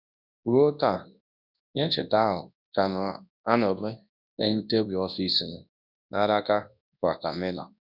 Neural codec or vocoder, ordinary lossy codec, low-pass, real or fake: codec, 24 kHz, 0.9 kbps, WavTokenizer, large speech release; none; 5.4 kHz; fake